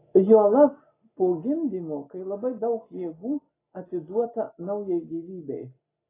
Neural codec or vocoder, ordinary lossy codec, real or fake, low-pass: none; AAC, 16 kbps; real; 3.6 kHz